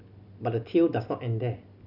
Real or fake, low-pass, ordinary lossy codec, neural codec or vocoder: real; 5.4 kHz; none; none